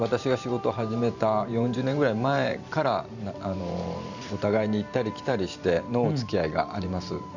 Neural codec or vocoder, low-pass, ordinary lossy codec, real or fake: vocoder, 44.1 kHz, 128 mel bands every 512 samples, BigVGAN v2; 7.2 kHz; none; fake